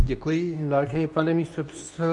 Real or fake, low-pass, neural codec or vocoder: fake; 10.8 kHz; codec, 24 kHz, 0.9 kbps, WavTokenizer, medium speech release version 2